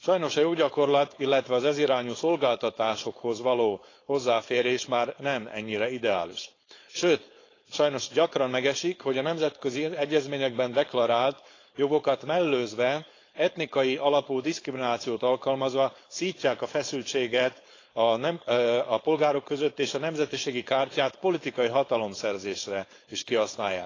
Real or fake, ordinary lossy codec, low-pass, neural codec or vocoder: fake; AAC, 32 kbps; 7.2 kHz; codec, 16 kHz, 4.8 kbps, FACodec